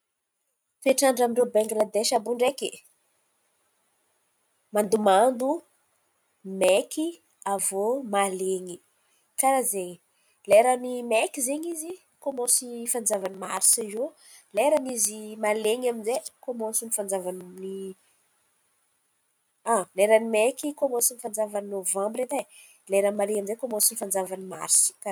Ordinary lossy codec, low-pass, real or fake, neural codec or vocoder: none; none; real; none